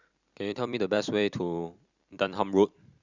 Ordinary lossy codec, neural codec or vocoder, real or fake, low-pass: Opus, 64 kbps; vocoder, 44.1 kHz, 128 mel bands every 256 samples, BigVGAN v2; fake; 7.2 kHz